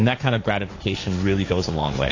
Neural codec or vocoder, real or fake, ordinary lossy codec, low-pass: codec, 16 kHz, 1.1 kbps, Voila-Tokenizer; fake; AAC, 32 kbps; 7.2 kHz